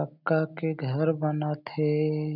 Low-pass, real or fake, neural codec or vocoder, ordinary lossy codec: 5.4 kHz; real; none; none